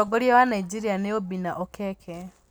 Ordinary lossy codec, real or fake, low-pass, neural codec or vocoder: none; real; none; none